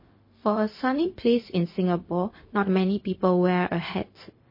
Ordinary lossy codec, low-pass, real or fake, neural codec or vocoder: MP3, 24 kbps; 5.4 kHz; fake; codec, 16 kHz, 0.4 kbps, LongCat-Audio-Codec